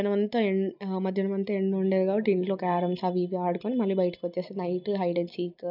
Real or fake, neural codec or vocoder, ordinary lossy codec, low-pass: real; none; none; 5.4 kHz